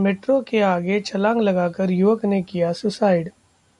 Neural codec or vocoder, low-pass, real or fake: none; 10.8 kHz; real